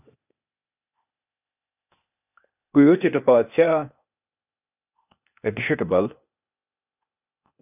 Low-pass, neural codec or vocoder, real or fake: 3.6 kHz; codec, 16 kHz, 0.8 kbps, ZipCodec; fake